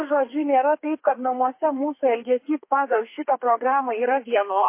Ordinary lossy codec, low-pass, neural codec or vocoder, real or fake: MP3, 24 kbps; 3.6 kHz; codec, 32 kHz, 1.9 kbps, SNAC; fake